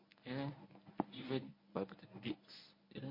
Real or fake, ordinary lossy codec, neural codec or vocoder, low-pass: fake; MP3, 32 kbps; codec, 24 kHz, 0.9 kbps, WavTokenizer, medium speech release version 1; 5.4 kHz